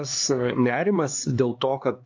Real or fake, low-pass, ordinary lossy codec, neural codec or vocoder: fake; 7.2 kHz; AAC, 48 kbps; codec, 16 kHz, 2 kbps, X-Codec, HuBERT features, trained on LibriSpeech